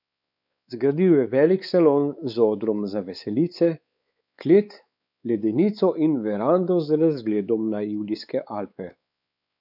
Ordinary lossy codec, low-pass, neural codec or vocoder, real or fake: none; 5.4 kHz; codec, 16 kHz, 4 kbps, X-Codec, WavLM features, trained on Multilingual LibriSpeech; fake